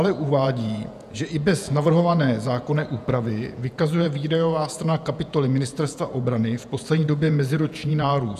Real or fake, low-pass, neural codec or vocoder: fake; 14.4 kHz; vocoder, 48 kHz, 128 mel bands, Vocos